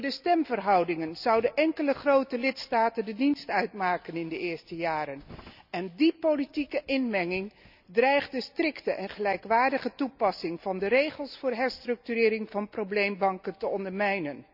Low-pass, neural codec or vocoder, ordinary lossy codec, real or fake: 5.4 kHz; none; none; real